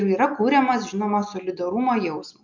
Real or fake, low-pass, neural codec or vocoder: real; 7.2 kHz; none